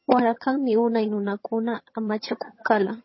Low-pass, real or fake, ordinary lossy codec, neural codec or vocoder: 7.2 kHz; fake; MP3, 24 kbps; vocoder, 22.05 kHz, 80 mel bands, HiFi-GAN